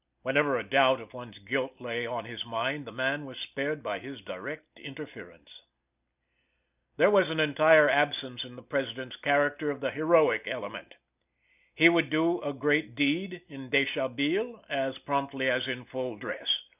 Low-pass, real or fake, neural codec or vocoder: 3.6 kHz; real; none